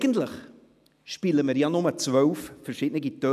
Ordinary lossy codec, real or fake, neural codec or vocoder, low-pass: none; real; none; 14.4 kHz